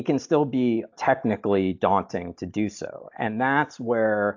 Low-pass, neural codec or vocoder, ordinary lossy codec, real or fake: 7.2 kHz; none; AAC, 48 kbps; real